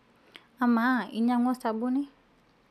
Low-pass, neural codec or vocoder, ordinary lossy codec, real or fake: 14.4 kHz; none; none; real